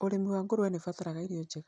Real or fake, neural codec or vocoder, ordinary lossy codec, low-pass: real; none; none; 9.9 kHz